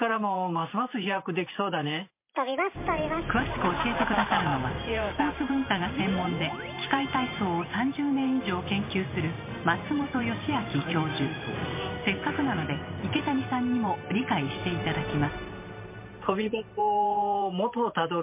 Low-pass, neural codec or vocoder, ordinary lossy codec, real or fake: 3.6 kHz; vocoder, 44.1 kHz, 128 mel bands every 512 samples, BigVGAN v2; MP3, 24 kbps; fake